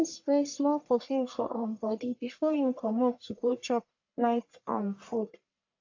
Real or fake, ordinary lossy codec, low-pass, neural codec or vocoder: fake; AAC, 48 kbps; 7.2 kHz; codec, 44.1 kHz, 1.7 kbps, Pupu-Codec